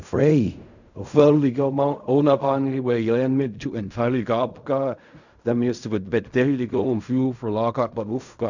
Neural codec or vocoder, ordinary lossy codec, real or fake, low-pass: codec, 16 kHz in and 24 kHz out, 0.4 kbps, LongCat-Audio-Codec, fine tuned four codebook decoder; none; fake; 7.2 kHz